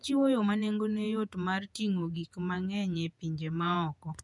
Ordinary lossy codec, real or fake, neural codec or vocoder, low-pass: none; fake; vocoder, 48 kHz, 128 mel bands, Vocos; 10.8 kHz